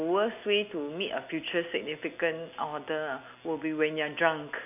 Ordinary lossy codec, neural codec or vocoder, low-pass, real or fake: none; none; 3.6 kHz; real